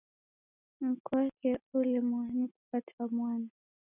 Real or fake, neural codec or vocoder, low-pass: real; none; 3.6 kHz